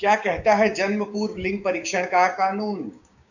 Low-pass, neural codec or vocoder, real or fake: 7.2 kHz; codec, 16 kHz, 6 kbps, DAC; fake